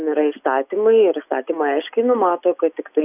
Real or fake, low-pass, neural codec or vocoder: real; 3.6 kHz; none